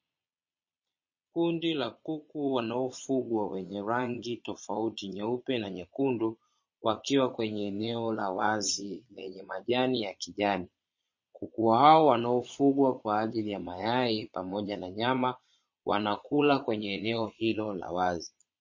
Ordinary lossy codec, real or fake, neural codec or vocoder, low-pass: MP3, 32 kbps; fake; vocoder, 22.05 kHz, 80 mel bands, Vocos; 7.2 kHz